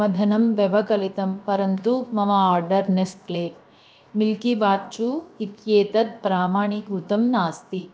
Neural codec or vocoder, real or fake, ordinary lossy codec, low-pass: codec, 16 kHz, 0.7 kbps, FocalCodec; fake; none; none